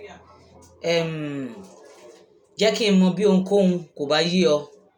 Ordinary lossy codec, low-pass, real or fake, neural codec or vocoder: none; 19.8 kHz; real; none